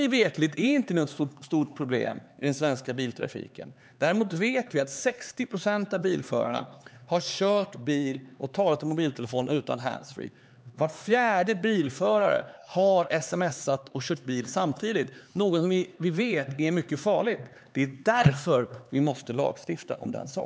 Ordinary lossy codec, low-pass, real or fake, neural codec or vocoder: none; none; fake; codec, 16 kHz, 4 kbps, X-Codec, HuBERT features, trained on LibriSpeech